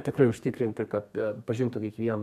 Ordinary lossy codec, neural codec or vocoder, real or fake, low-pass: MP3, 96 kbps; codec, 32 kHz, 1.9 kbps, SNAC; fake; 14.4 kHz